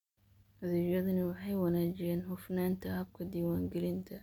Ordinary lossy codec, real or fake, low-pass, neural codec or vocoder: MP3, 96 kbps; real; 19.8 kHz; none